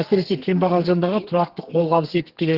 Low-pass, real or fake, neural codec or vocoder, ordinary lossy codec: 5.4 kHz; fake; codec, 32 kHz, 1.9 kbps, SNAC; Opus, 16 kbps